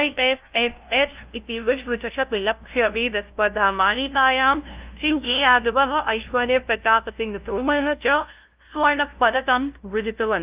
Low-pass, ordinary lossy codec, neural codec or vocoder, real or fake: 3.6 kHz; Opus, 24 kbps; codec, 16 kHz, 0.5 kbps, FunCodec, trained on LibriTTS, 25 frames a second; fake